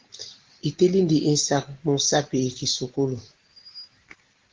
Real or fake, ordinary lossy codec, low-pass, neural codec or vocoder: real; Opus, 16 kbps; 7.2 kHz; none